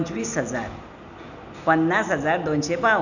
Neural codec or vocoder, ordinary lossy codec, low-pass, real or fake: none; none; 7.2 kHz; real